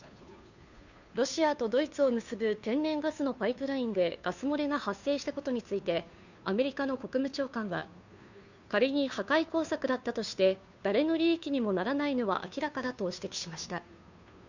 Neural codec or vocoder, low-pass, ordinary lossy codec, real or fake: codec, 16 kHz, 2 kbps, FunCodec, trained on Chinese and English, 25 frames a second; 7.2 kHz; none; fake